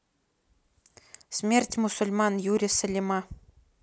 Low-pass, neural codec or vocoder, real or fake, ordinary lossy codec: none; none; real; none